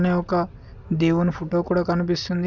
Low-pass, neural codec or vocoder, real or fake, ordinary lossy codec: 7.2 kHz; none; real; none